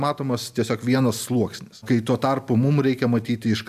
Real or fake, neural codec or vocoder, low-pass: real; none; 14.4 kHz